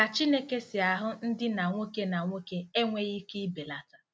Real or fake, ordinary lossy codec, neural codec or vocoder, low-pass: real; none; none; none